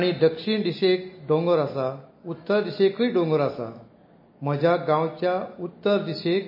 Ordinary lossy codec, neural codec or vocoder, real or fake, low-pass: MP3, 24 kbps; none; real; 5.4 kHz